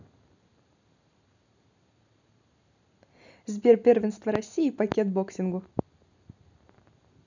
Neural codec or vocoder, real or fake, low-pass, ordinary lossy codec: none; real; 7.2 kHz; none